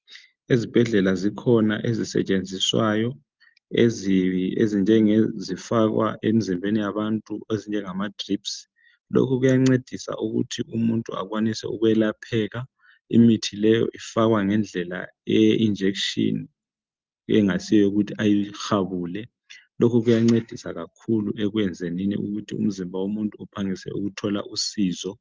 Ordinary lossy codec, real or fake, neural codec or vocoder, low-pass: Opus, 32 kbps; real; none; 7.2 kHz